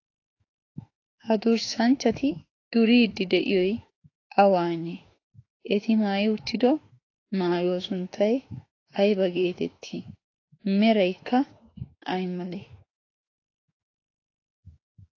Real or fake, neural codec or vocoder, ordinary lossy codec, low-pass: fake; autoencoder, 48 kHz, 32 numbers a frame, DAC-VAE, trained on Japanese speech; AAC, 32 kbps; 7.2 kHz